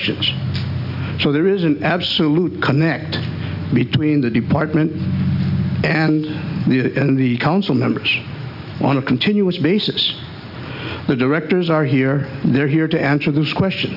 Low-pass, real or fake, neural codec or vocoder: 5.4 kHz; real; none